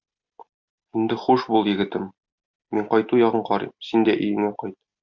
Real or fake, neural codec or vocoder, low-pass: real; none; 7.2 kHz